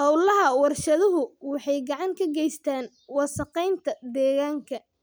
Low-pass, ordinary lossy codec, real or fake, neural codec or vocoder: none; none; real; none